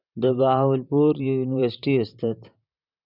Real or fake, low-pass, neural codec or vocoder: fake; 5.4 kHz; vocoder, 44.1 kHz, 128 mel bands, Pupu-Vocoder